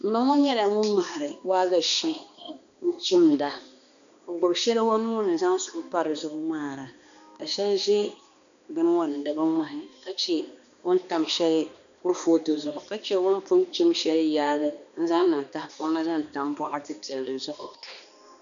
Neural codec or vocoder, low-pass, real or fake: codec, 16 kHz, 2 kbps, X-Codec, HuBERT features, trained on balanced general audio; 7.2 kHz; fake